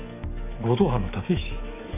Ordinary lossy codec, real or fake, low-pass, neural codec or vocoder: none; fake; 3.6 kHz; codec, 44.1 kHz, 7.8 kbps, DAC